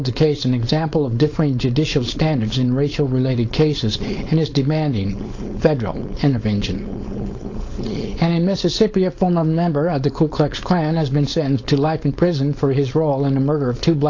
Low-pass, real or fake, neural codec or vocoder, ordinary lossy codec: 7.2 kHz; fake; codec, 16 kHz, 4.8 kbps, FACodec; AAC, 48 kbps